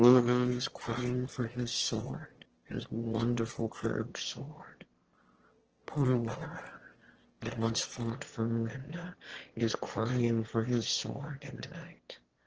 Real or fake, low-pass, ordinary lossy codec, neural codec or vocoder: fake; 7.2 kHz; Opus, 16 kbps; autoencoder, 22.05 kHz, a latent of 192 numbers a frame, VITS, trained on one speaker